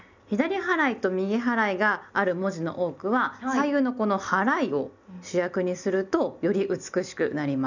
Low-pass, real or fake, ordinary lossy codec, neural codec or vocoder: 7.2 kHz; real; none; none